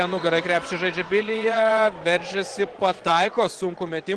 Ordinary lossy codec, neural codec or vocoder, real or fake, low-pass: Opus, 16 kbps; vocoder, 22.05 kHz, 80 mel bands, Vocos; fake; 9.9 kHz